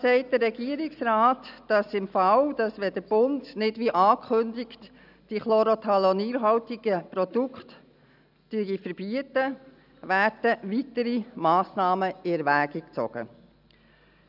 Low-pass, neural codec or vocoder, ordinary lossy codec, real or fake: 5.4 kHz; none; none; real